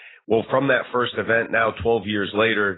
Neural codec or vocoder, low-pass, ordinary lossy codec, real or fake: none; 7.2 kHz; AAC, 16 kbps; real